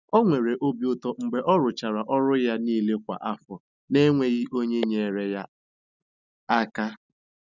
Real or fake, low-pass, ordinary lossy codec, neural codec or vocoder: real; 7.2 kHz; none; none